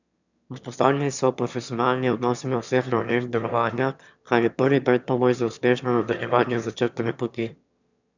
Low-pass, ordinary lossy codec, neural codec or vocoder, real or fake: 7.2 kHz; none; autoencoder, 22.05 kHz, a latent of 192 numbers a frame, VITS, trained on one speaker; fake